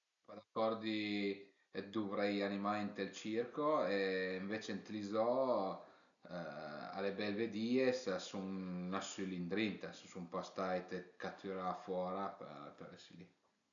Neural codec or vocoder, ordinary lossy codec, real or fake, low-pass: none; none; real; 7.2 kHz